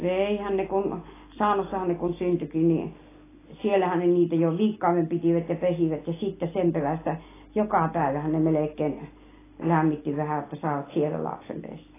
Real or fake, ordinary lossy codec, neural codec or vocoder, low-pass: real; AAC, 16 kbps; none; 3.6 kHz